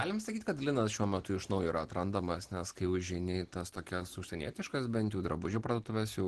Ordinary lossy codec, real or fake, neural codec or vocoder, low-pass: Opus, 16 kbps; real; none; 10.8 kHz